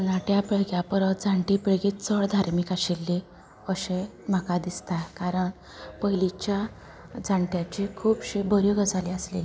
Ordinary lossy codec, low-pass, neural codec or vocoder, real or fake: none; none; none; real